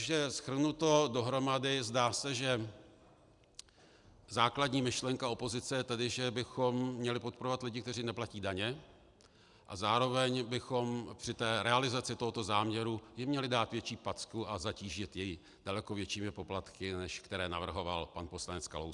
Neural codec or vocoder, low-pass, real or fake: none; 10.8 kHz; real